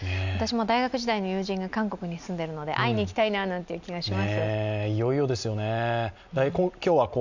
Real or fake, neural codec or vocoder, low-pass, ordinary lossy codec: real; none; 7.2 kHz; none